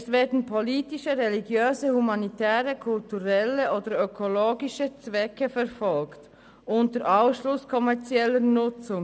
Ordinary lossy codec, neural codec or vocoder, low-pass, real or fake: none; none; none; real